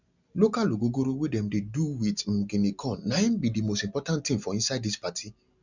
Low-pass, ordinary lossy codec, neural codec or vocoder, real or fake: 7.2 kHz; none; none; real